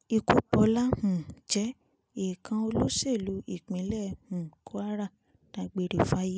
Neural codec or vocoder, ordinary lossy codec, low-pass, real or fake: none; none; none; real